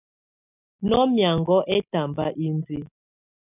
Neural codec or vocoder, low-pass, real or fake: none; 3.6 kHz; real